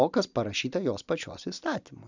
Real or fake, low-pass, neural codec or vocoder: real; 7.2 kHz; none